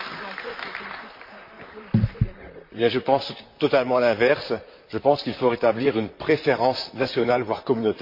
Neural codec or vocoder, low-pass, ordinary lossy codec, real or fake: vocoder, 44.1 kHz, 128 mel bands, Pupu-Vocoder; 5.4 kHz; MP3, 32 kbps; fake